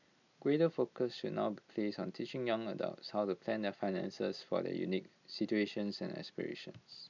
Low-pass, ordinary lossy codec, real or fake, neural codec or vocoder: 7.2 kHz; none; real; none